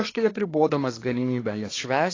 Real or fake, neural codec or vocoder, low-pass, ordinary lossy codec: fake; codec, 24 kHz, 1 kbps, SNAC; 7.2 kHz; AAC, 32 kbps